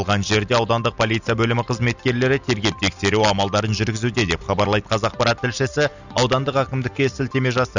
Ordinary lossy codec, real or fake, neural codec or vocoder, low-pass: none; real; none; 7.2 kHz